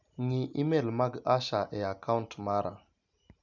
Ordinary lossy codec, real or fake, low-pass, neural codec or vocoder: none; real; 7.2 kHz; none